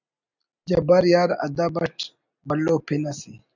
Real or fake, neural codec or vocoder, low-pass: real; none; 7.2 kHz